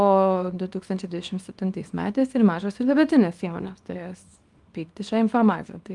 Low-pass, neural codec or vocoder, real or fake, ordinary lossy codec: 10.8 kHz; codec, 24 kHz, 0.9 kbps, WavTokenizer, small release; fake; Opus, 32 kbps